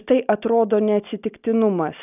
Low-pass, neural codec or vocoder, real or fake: 3.6 kHz; none; real